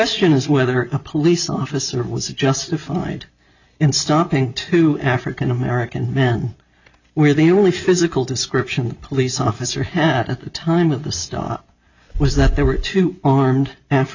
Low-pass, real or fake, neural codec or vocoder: 7.2 kHz; real; none